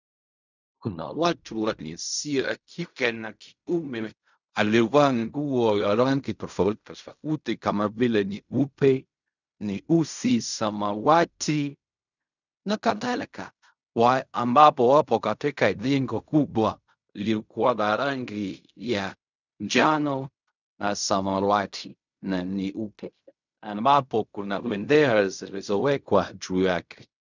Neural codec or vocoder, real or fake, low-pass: codec, 16 kHz in and 24 kHz out, 0.4 kbps, LongCat-Audio-Codec, fine tuned four codebook decoder; fake; 7.2 kHz